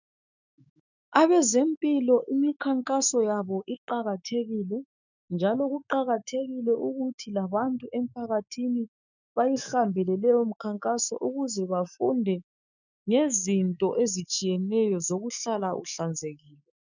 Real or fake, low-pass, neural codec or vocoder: fake; 7.2 kHz; autoencoder, 48 kHz, 128 numbers a frame, DAC-VAE, trained on Japanese speech